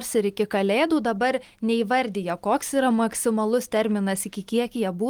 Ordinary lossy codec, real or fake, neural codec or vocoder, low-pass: Opus, 24 kbps; real; none; 19.8 kHz